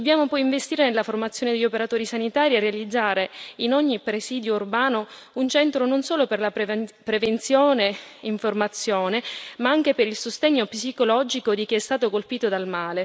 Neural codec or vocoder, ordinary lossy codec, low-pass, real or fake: none; none; none; real